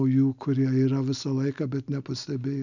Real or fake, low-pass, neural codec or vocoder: real; 7.2 kHz; none